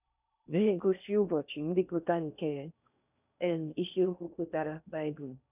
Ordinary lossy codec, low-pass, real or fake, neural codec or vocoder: none; 3.6 kHz; fake; codec, 16 kHz in and 24 kHz out, 0.6 kbps, FocalCodec, streaming, 4096 codes